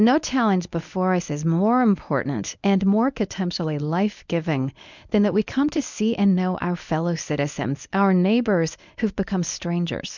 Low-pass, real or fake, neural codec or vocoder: 7.2 kHz; fake; codec, 24 kHz, 0.9 kbps, WavTokenizer, medium speech release version 1